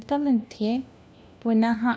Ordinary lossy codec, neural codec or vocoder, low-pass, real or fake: none; codec, 16 kHz, 1 kbps, FunCodec, trained on LibriTTS, 50 frames a second; none; fake